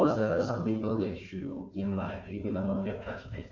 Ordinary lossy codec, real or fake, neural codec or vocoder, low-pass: none; fake; codec, 16 kHz, 1 kbps, FunCodec, trained on Chinese and English, 50 frames a second; 7.2 kHz